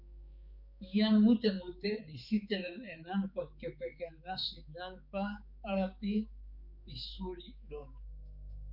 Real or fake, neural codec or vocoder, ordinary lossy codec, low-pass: fake; codec, 16 kHz, 4 kbps, X-Codec, HuBERT features, trained on balanced general audio; Opus, 64 kbps; 5.4 kHz